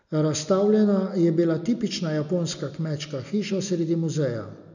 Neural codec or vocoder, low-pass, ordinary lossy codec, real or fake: none; 7.2 kHz; none; real